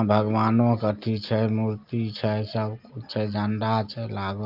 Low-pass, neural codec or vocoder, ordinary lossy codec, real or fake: 5.4 kHz; none; Opus, 24 kbps; real